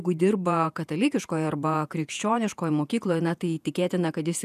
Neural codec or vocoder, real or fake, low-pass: vocoder, 48 kHz, 128 mel bands, Vocos; fake; 14.4 kHz